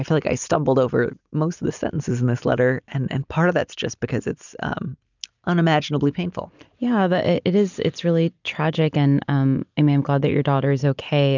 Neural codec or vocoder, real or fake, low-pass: none; real; 7.2 kHz